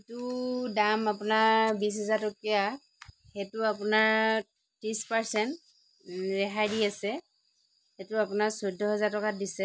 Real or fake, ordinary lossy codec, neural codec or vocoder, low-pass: real; none; none; none